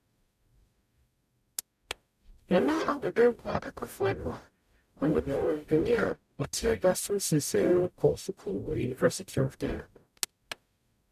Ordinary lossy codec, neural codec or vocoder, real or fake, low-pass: none; codec, 44.1 kHz, 0.9 kbps, DAC; fake; 14.4 kHz